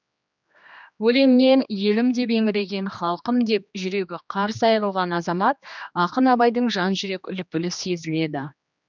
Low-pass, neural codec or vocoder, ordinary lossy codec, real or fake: 7.2 kHz; codec, 16 kHz, 2 kbps, X-Codec, HuBERT features, trained on general audio; none; fake